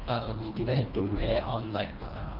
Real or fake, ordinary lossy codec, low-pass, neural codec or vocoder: fake; Opus, 24 kbps; 5.4 kHz; codec, 24 kHz, 1.5 kbps, HILCodec